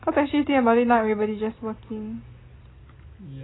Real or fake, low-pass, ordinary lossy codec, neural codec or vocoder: real; 7.2 kHz; AAC, 16 kbps; none